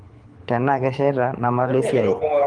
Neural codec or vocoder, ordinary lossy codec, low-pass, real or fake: codec, 24 kHz, 6 kbps, HILCodec; Opus, 24 kbps; 9.9 kHz; fake